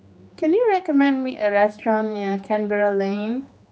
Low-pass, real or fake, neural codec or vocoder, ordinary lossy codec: none; fake; codec, 16 kHz, 2 kbps, X-Codec, HuBERT features, trained on general audio; none